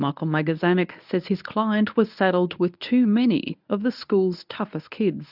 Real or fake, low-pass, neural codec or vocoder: fake; 5.4 kHz; codec, 24 kHz, 0.9 kbps, WavTokenizer, medium speech release version 1